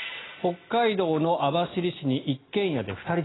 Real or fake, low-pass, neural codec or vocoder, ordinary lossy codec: real; 7.2 kHz; none; AAC, 16 kbps